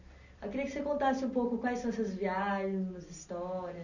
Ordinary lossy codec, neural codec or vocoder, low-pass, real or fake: none; none; 7.2 kHz; real